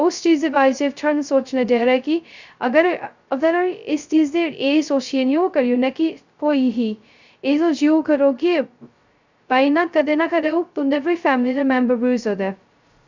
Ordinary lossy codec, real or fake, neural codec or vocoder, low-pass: Opus, 64 kbps; fake; codec, 16 kHz, 0.2 kbps, FocalCodec; 7.2 kHz